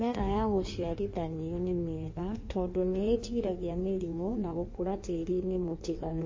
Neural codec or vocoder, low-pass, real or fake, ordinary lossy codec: codec, 16 kHz in and 24 kHz out, 1.1 kbps, FireRedTTS-2 codec; 7.2 kHz; fake; MP3, 32 kbps